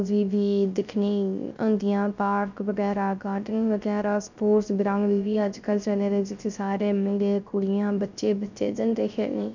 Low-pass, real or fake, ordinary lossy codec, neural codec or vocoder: 7.2 kHz; fake; none; codec, 16 kHz, 0.3 kbps, FocalCodec